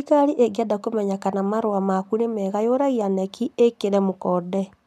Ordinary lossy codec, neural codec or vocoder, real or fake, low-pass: none; none; real; 14.4 kHz